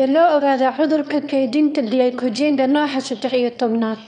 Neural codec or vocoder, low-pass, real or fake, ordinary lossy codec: autoencoder, 22.05 kHz, a latent of 192 numbers a frame, VITS, trained on one speaker; 9.9 kHz; fake; none